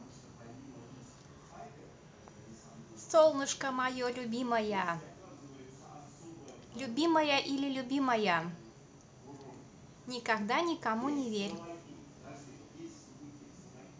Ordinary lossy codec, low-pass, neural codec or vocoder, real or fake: none; none; none; real